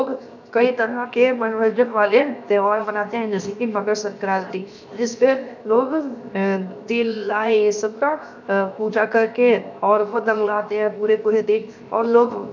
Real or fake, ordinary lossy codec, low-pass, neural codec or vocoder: fake; none; 7.2 kHz; codec, 16 kHz, 0.7 kbps, FocalCodec